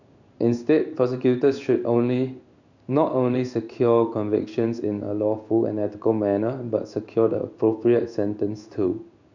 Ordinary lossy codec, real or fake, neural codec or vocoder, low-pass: none; fake; codec, 16 kHz in and 24 kHz out, 1 kbps, XY-Tokenizer; 7.2 kHz